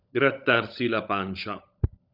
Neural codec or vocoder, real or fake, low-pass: codec, 16 kHz, 16 kbps, FunCodec, trained on LibriTTS, 50 frames a second; fake; 5.4 kHz